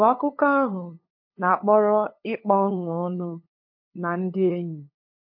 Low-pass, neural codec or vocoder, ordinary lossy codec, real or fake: 5.4 kHz; codec, 16 kHz, 2 kbps, FunCodec, trained on LibriTTS, 25 frames a second; MP3, 32 kbps; fake